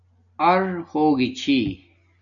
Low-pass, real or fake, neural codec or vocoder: 7.2 kHz; real; none